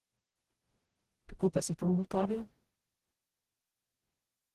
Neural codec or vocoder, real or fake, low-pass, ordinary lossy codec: codec, 44.1 kHz, 0.9 kbps, DAC; fake; 14.4 kHz; Opus, 16 kbps